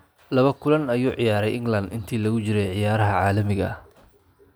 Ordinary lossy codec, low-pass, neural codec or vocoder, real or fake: none; none; none; real